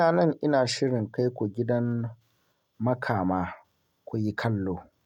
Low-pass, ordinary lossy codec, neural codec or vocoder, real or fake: 19.8 kHz; none; none; real